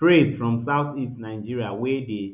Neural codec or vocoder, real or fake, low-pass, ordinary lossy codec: none; real; 3.6 kHz; none